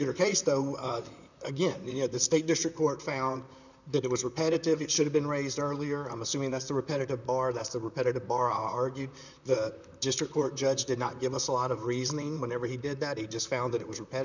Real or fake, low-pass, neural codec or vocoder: fake; 7.2 kHz; vocoder, 44.1 kHz, 128 mel bands, Pupu-Vocoder